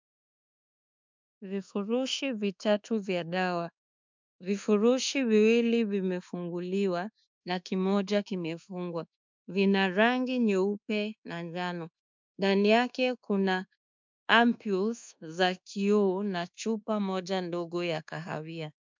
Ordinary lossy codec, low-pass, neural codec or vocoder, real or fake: MP3, 64 kbps; 7.2 kHz; codec, 24 kHz, 1.2 kbps, DualCodec; fake